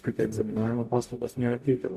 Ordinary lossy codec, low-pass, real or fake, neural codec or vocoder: MP3, 96 kbps; 14.4 kHz; fake; codec, 44.1 kHz, 0.9 kbps, DAC